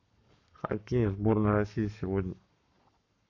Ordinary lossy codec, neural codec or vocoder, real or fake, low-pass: none; codec, 44.1 kHz, 7.8 kbps, Pupu-Codec; fake; 7.2 kHz